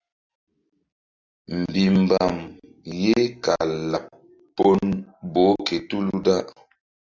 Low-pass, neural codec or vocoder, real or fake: 7.2 kHz; none; real